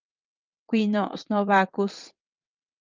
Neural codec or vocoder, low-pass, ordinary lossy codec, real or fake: vocoder, 22.05 kHz, 80 mel bands, WaveNeXt; 7.2 kHz; Opus, 32 kbps; fake